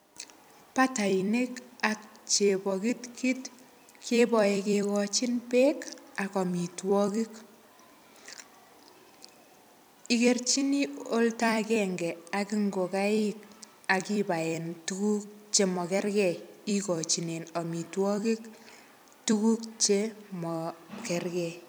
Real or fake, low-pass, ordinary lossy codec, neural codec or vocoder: fake; none; none; vocoder, 44.1 kHz, 128 mel bands every 256 samples, BigVGAN v2